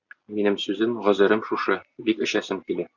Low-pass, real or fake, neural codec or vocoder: 7.2 kHz; real; none